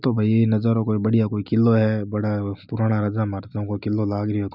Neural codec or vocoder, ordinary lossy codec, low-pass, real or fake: none; none; 5.4 kHz; real